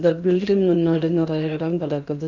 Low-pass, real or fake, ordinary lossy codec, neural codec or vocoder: 7.2 kHz; fake; none; codec, 16 kHz in and 24 kHz out, 0.8 kbps, FocalCodec, streaming, 65536 codes